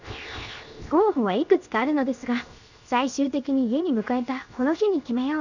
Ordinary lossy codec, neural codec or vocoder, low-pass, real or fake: none; codec, 16 kHz, 0.7 kbps, FocalCodec; 7.2 kHz; fake